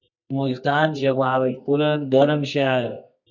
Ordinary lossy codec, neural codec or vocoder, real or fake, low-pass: MP3, 64 kbps; codec, 24 kHz, 0.9 kbps, WavTokenizer, medium music audio release; fake; 7.2 kHz